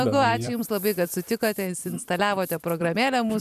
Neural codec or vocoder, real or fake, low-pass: vocoder, 44.1 kHz, 128 mel bands every 256 samples, BigVGAN v2; fake; 14.4 kHz